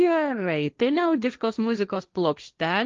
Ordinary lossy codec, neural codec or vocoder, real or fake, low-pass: Opus, 32 kbps; codec, 16 kHz, 1.1 kbps, Voila-Tokenizer; fake; 7.2 kHz